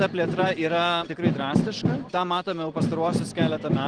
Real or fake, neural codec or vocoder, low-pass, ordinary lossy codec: real; none; 9.9 kHz; Opus, 16 kbps